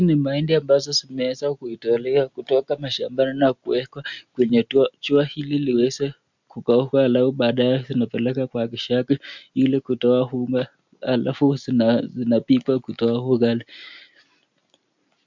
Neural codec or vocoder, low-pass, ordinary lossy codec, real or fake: none; 7.2 kHz; MP3, 64 kbps; real